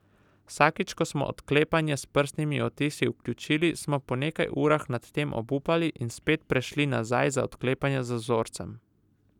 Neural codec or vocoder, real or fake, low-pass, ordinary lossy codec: none; real; 19.8 kHz; none